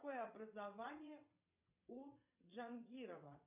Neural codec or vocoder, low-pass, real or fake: vocoder, 22.05 kHz, 80 mel bands, WaveNeXt; 3.6 kHz; fake